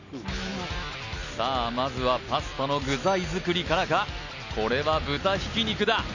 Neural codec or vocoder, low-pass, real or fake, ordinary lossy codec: none; 7.2 kHz; real; none